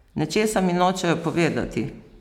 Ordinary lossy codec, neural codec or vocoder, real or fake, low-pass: none; none; real; 19.8 kHz